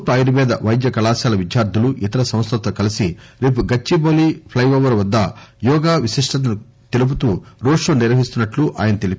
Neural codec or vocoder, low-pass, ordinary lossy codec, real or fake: none; none; none; real